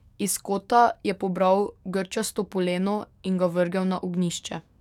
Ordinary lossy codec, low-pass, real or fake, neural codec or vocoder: none; 19.8 kHz; fake; codec, 44.1 kHz, 7.8 kbps, DAC